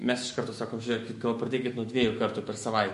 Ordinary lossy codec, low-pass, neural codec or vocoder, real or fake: MP3, 48 kbps; 14.4 kHz; autoencoder, 48 kHz, 128 numbers a frame, DAC-VAE, trained on Japanese speech; fake